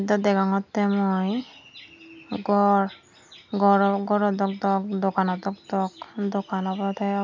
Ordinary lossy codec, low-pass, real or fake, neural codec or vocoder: MP3, 64 kbps; 7.2 kHz; real; none